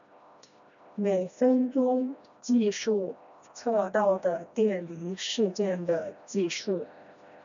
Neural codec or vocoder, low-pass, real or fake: codec, 16 kHz, 1 kbps, FreqCodec, smaller model; 7.2 kHz; fake